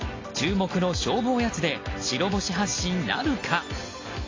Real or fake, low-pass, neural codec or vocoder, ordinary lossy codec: real; 7.2 kHz; none; AAC, 32 kbps